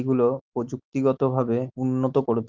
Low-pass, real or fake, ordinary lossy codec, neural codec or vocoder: 7.2 kHz; real; Opus, 24 kbps; none